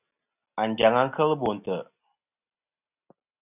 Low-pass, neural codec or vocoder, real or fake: 3.6 kHz; none; real